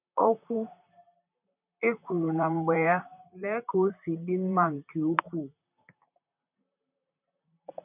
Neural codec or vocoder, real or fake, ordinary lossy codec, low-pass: vocoder, 44.1 kHz, 128 mel bands, Pupu-Vocoder; fake; none; 3.6 kHz